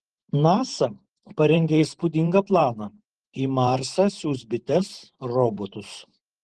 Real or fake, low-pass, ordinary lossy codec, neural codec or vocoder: fake; 10.8 kHz; Opus, 16 kbps; vocoder, 44.1 kHz, 128 mel bands every 512 samples, BigVGAN v2